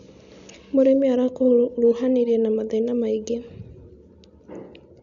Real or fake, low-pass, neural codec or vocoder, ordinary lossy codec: real; 7.2 kHz; none; none